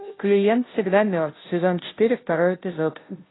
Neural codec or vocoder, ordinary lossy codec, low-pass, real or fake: codec, 16 kHz, 0.5 kbps, FunCodec, trained on Chinese and English, 25 frames a second; AAC, 16 kbps; 7.2 kHz; fake